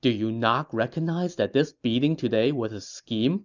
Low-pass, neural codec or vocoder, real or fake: 7.2 kHz; none; real